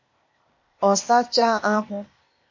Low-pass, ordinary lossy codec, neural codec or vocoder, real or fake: 7.2 kHz; MP3, 48 kbps; codec, 16 kHz, 0.8 kbps, ZipCodec; fake